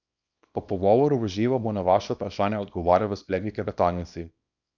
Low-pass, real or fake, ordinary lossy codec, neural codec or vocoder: 7.2 kHz; fake; none; codec, 24 kHz, 0.9 kbps, WavTokenizer, small release